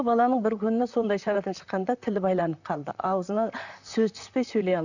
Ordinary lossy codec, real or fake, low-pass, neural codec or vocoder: none; fake; 7.2 kHz; vocoder, 44.1 kHz, 128 mel bands, Pupu-Vocoder